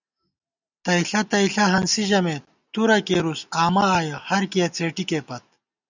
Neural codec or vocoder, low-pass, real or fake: none; 7.2 kHz; real